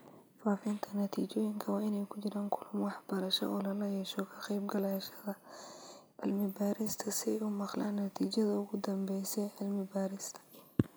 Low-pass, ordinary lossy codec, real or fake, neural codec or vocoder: none; none; real; none